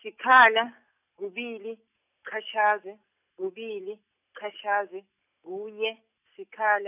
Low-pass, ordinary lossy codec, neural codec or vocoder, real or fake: 3.6 kHz; none; none; real